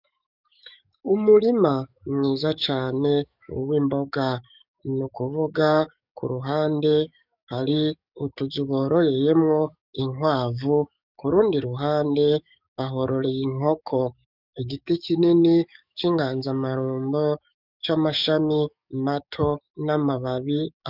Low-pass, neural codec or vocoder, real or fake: 5.4 kHz; codec, 44.1 kHz, 7.8 kbps, DAC; fake